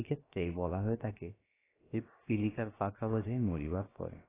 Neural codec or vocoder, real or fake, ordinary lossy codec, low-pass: codec, 16 kHz, about 1 kbps, DyCAST, with the encoder's durations; fake; AAC, 16 kbps; 3.6 kHz